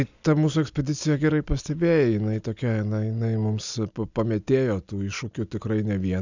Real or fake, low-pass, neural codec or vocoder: real; 7.2 kHz; none